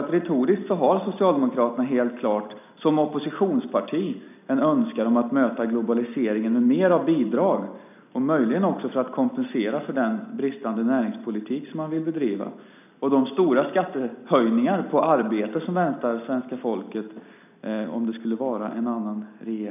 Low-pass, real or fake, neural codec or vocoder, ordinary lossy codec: 3.6 kHz; real; none; none